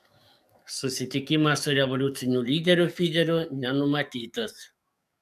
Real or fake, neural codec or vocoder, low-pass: fake; codec, 44.1 kHz, 7.8 kbps, DAC; 14.4 kHz